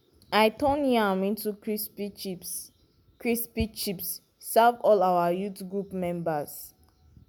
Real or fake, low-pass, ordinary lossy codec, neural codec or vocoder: real; none; none; none